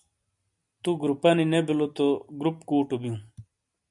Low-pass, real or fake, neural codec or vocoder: 10.8 kHz; real; none